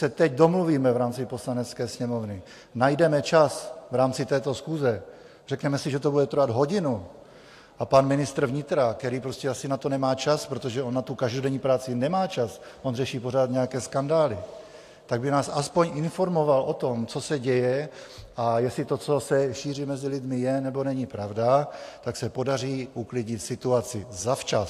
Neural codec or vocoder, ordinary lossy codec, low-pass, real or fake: none; AAC, 64 kbps; 14.4 kHz; real